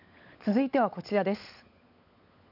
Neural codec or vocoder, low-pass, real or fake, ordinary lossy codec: codec, 16 kHz, 16 kbps, FunCodec, trained on LibriTTS, 50 frames a second; 5.4 kHz; fake; none